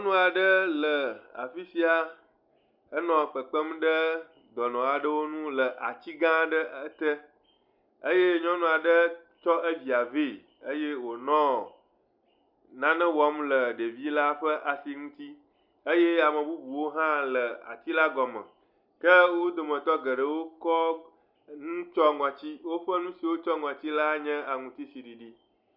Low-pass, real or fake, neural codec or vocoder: 5.4 kHz; real; none